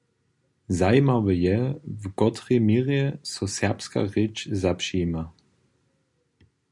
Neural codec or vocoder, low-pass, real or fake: none; 10.8 kHz; real